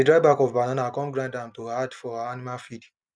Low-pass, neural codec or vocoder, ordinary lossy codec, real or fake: 9.9 kHz; none; none; real